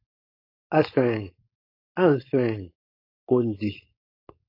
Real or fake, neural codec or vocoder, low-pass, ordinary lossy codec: fake; codec, 16 kHz, 4.8 kbps, FACodec; 5.4 kHz; AAC, 32 kbps